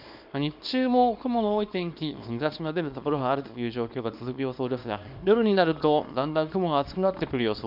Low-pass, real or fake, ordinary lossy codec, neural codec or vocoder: 5.4 kHz; fake; none; codec, 24 kHz, 0.9 kbps, WavTokenizer, small release